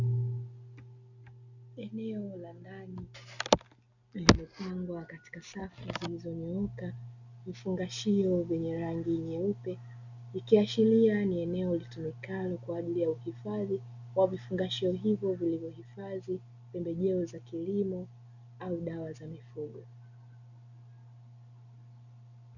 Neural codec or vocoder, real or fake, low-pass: none; real; 7.2 kHz